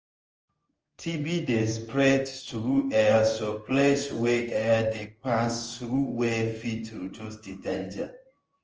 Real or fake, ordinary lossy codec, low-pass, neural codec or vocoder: fake; Opus, 24 kbps; 7.2 kHz; codec, 16 kHz in and 24 kHz out, 1 kbps, XY-Tokenizer